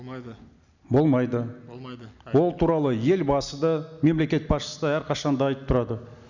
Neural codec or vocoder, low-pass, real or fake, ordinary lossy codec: none; 7.2 kHz; real; none